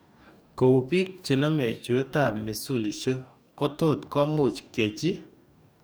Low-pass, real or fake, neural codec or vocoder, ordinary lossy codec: none; fake; codec, 44.1 kHz, 2.6 kbps, DAC; none